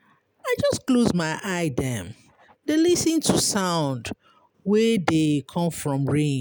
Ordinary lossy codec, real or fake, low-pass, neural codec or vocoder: none; real; none; none